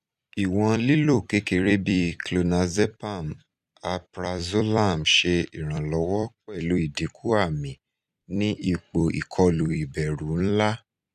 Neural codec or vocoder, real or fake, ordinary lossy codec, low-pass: vocoder, 44.1 kHz, 128 mel bands every 256 samples, BigVGAN v2; fake; none; 14.4 kHz